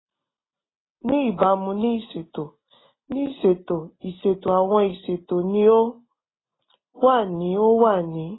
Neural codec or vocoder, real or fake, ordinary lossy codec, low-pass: none; real; AAC, 16 kbps; 7.2 kHz